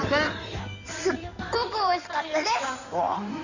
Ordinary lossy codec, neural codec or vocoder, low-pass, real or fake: none; codec, 16 kHz in and 24 kHz out, 2.2 kbps, FireRedTTS-2 codec; 7.2 kHz; fake